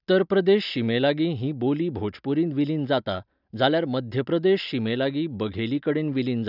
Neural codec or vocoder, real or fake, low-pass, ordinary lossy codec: none; real; 5.4 kHz; none